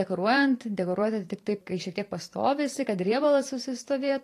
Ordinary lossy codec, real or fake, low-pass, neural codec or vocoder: AAC, 48 kbps; fake; 14.4 kHz; vocoder, 44.1 kHz, 128 mel bands every 512 samples, BigVGAN v2